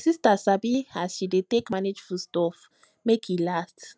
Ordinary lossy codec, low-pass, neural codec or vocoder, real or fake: none; none; none; real